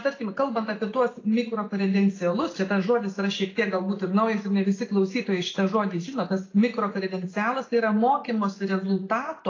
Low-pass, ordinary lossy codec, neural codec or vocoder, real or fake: 7.2 kHz; AAC, 32 kbps; none; real